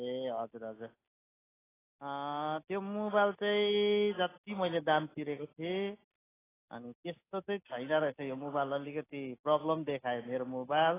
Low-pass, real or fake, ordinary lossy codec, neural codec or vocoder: 3.6 kHz; real; AAC, 16 kbps; none